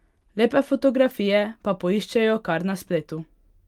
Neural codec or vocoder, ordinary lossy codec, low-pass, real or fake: none; Opus, 32 kbps; 19.8 kHz; real